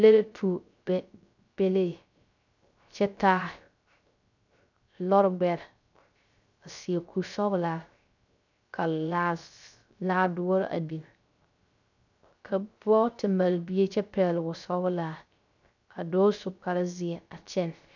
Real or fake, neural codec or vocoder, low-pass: fake; codec, 16 kHz, 0.3 kbps, FocalCodec; 7.2 kHz